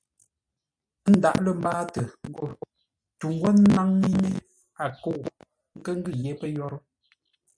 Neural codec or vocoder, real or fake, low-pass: none; real; 9.9 kHz